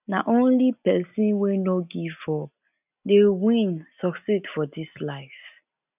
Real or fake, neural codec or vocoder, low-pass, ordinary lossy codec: real; none; 3.6 kHz; none